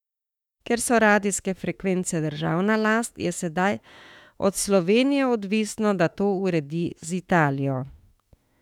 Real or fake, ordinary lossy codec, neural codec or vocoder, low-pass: fake; none; autoencoder, 48 kHz, 32 numbers a frame, DAC-VAE, trained on Japanese speech; 19.8 kHz